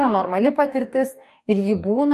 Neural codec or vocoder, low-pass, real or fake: codec, 44.1 kHz, 2.6 kbps, DAC; 14.4 kHz; fake